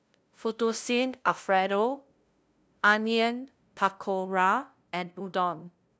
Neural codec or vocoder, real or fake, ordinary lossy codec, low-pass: codec, 16 kHz, 0.5 kbps, FunCodec, trained on LibriTTS, 25 frames a second; fake; none; none